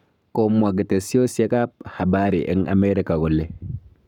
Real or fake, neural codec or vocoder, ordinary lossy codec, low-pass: fake; codec, 44.1 kHz, 7.8 kbps, Pupu-Codec; none; 19.8 kHz